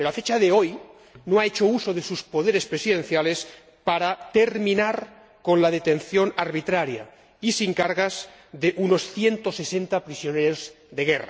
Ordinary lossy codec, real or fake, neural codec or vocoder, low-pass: none; real; none; none